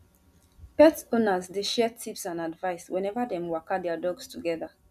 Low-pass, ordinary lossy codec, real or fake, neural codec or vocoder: 14.4 kHz; none; real; none